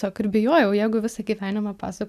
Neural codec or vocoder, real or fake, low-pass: none; real; 14.4 kHz